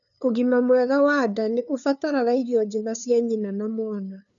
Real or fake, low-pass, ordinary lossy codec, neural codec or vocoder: fake; 7.2 kHz; none; codec, 16 kHz, 2 kbps, FunCodec, trained on LibriTTS, 25 frames a second